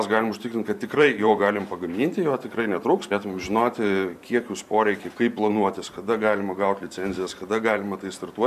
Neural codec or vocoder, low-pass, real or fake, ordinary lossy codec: vocoder, 44.1 kHz, 128 mel bands every 512 samples, BigVGAN v2; 14.4 kHz; fake; AAC, 96 kbps